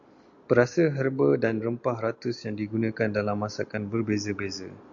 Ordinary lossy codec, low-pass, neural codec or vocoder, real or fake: AAC, 64 kbps; 7.2 kHz; none; real